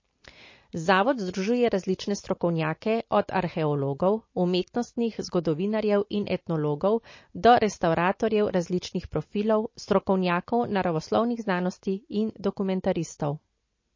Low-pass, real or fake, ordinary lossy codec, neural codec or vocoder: 7.2 kHz; real; MP3, 32 kbps; none